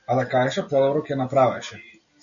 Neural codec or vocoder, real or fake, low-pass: none; real; 7.2 kHz